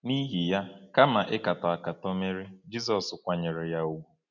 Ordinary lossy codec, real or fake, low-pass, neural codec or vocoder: none; real; 7.2 kHz; none